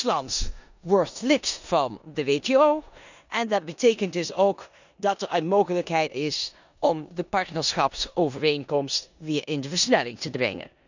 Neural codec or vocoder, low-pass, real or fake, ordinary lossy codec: codec, 16 kHz in and 24 kHz out, 0.9 kbps, LongCat-Audio-Codec, four codebook decoder; 7.2 kHz; fake; none